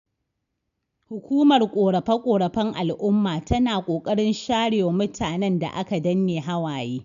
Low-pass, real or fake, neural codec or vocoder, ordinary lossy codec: 7.2 kHz; real; none; none